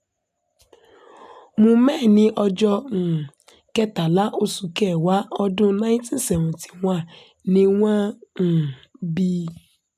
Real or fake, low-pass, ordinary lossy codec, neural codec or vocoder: real; 14.4 kHz; none; none